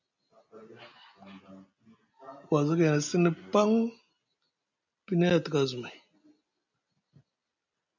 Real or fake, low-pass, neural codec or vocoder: real; 7.2 kHz; none